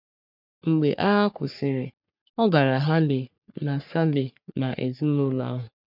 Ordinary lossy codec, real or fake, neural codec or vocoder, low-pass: none; fake; codec, 44.1 kHz, 3.4 kbps, Pupu-Codec; 5.4 kHz